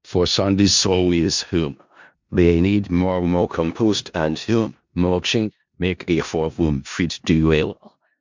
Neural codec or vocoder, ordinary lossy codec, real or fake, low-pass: codec, 16 kHz in and 24 kHz out, 0.4 kbps, LongCat-Audio-Codec, four codebook decoder; MP3, 64 kbps; fake; 7.2 kHz